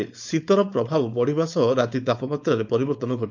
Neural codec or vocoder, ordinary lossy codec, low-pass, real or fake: codec, 16 kHz, 4.8 kbps, FACodec; none; 7.2 kHz; fake